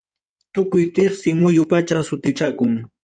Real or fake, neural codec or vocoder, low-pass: fake; codec, 16 kHz in and 24 kHz out, 2.2 kbps, FireRedTTS-2 codec; 9.9 kHz